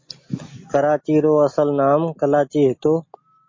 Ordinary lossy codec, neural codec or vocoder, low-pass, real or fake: MP3, 32 kbps; none; 7.2 kHz; real